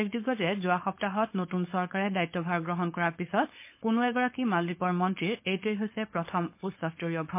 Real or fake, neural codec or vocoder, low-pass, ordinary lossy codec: fake; codec, 16 kHz, 4.8 kbps, FACodec; 3.6 kHz; MP3, 24 kbps